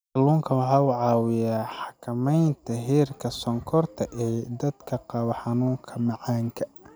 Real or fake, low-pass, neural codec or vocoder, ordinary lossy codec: real; none; none; none